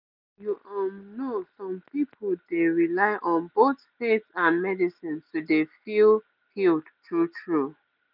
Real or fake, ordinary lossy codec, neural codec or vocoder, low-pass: real; none; none; 5.4 kHz